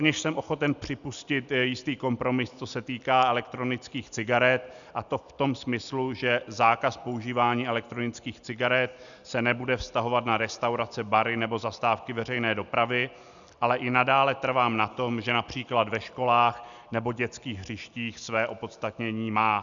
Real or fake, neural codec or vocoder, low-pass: real; none; 7.2 kHz